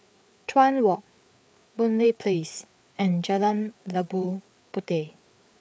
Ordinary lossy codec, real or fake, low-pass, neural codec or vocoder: none; fake; none; codec, 16 kHz, 4 kbps, FreqCodec, larger model